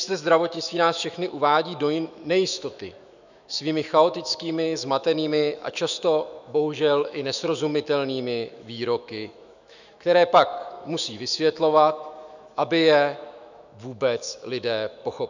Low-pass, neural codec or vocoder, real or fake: 7.2 kHz; autoencoder, 48 kHz, 128 numbers a frame, DAC-VAE, trained on Japanese speech; fake